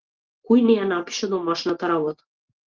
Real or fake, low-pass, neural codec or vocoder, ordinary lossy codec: real; 7.2 kHz; none; Opus, 16 kbps